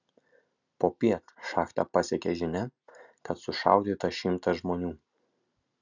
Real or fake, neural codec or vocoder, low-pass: real; none; 7.2 kHz